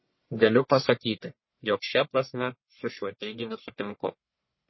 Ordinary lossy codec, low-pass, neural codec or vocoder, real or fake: MP3, 24 kbps; 7.2 kHz; codec, 44.1 kHz, 1.7 kbps, Pupu-Codec; fake